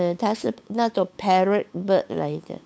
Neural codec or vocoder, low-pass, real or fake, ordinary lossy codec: codec, 16 kHz, 8 kbps, FunCodec, trained on LibriTTS, 25 frames a second; none; fake; none